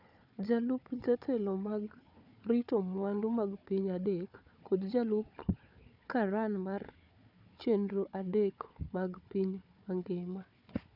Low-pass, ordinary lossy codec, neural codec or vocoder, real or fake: 5.4 kHz; none; codec, 16 kHz, 4 kbps, FunCodec, trained on Chinese and English, 50 frames a second; fake